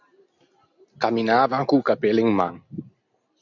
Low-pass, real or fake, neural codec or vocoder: 7.2 kHz; real; none